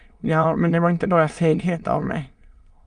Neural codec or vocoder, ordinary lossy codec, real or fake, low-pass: autoencoder, 22.05 kHz, a latent of 192 numbers a frame, VITS, trained on many speakers; Opus, 32 kbps; fake; 9.9 kHz